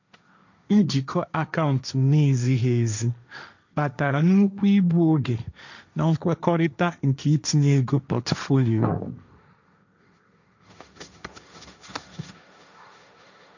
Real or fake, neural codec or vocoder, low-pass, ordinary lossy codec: fake; codec, 16 kHz, 1.1 kbps, Voila-Tokenizer; 7.2 kHz; none